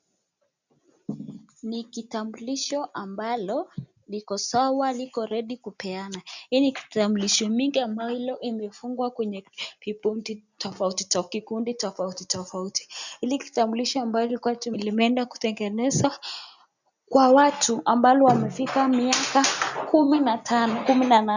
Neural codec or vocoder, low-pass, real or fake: none; 7.2 kHz; real